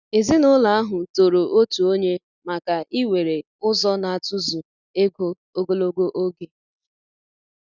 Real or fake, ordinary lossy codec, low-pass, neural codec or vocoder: real; none; 7.2 kHz; none